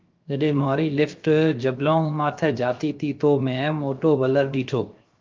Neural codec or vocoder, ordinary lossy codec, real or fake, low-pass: codec, 16 kHz, 0.7 kbps, FocalCodec; Opus, 24 kbps; fake; 7.2 kHz